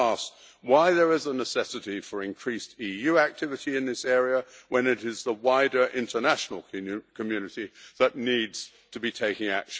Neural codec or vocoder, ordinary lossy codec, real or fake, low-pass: none; none; real; none